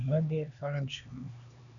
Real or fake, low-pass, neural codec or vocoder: fake; 7.2 kHz; codec, 16 kHz, 2 kbps, X-Codec, HuBERT features, trained on LibriSpeech